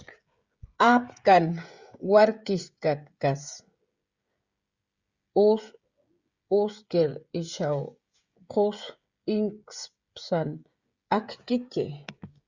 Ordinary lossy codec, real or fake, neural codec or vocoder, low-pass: Opus, 64 kbps; fake; codec, 16 kHz, 16 kbps, FreqCodec, smaller model; 7.2 kHz